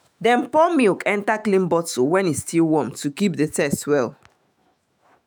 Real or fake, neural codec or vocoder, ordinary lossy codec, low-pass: fake; autoencoder, 48 kHz, 128 numbers a frame, DAC-VAE, trained on Japanese speech; none; none